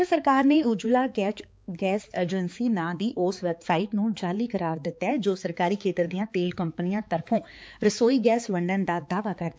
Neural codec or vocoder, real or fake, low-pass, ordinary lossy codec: codec, 16 kHz, 4 kbps, X-Codec, HuBERT features, trained on balanced general audio; fake; none; none